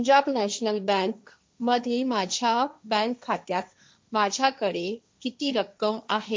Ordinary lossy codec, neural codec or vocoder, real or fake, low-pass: none; codec, 16 kHz, 1.1 kbps, Voila-Tokenizer; fake; none